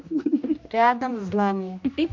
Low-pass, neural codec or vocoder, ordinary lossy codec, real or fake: 7.2 kHz; codec, 16 kHz, 1 kbps, X-Codec, HuBERT features, trained on general audio; MP3, 48 kbps; fake